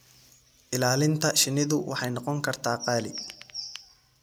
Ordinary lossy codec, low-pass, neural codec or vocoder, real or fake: none; none; none; real